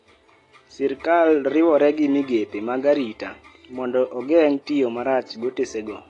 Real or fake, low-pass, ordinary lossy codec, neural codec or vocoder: real; 10.8 kHz; AAC, 32 kbps; none